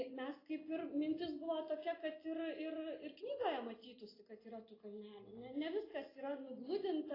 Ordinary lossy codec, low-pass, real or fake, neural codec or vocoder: AAC, 24 kbps; 5.4 kHz; real; none